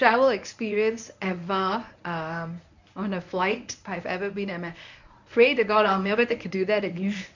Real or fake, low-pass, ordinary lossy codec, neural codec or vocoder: fake; 7.2 kHz; none; codec, 24 kHz, 0.9 kbps, WavTokenizer, medium speech release version 1